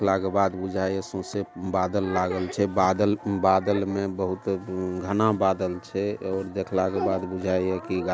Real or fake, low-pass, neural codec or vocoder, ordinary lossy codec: real; none; none; none